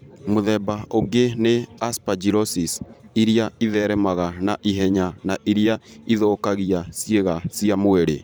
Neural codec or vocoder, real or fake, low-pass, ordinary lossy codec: vocoder, 44.1 kHz, 128 mel bands every 512 samples, BigVGAN v2; fake; none; none